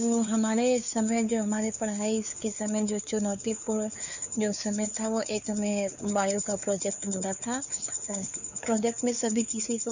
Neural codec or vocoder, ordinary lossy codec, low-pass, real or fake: codec, 16 kHz, 4 kbps, X-Codec, WavLM features, trained on Multilingual LibriSpeech; none; 7.2 kHz; fake